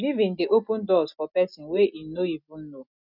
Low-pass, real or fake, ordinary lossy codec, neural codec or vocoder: 5.4 kHz; real; none; none